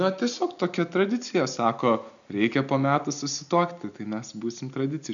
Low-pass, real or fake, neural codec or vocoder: 7.2 kHz; real; none